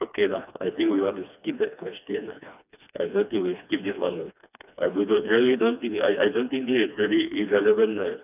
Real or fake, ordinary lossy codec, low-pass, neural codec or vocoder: fake; none; 3.6 kHz; codec, 16 kHz, 2 kbps, FreqCodec, smaller model